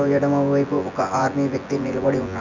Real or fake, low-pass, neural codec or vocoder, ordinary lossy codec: fake; 7.2 kHz; vocoder, 24 kHz, 100 mel bands, Vocos; none